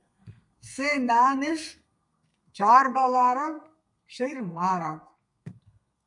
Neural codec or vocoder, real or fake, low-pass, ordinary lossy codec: codec, 32 kHz, 1.9 kbps, SNAC; fake; 10.8 kHz; MP3, 96 kbps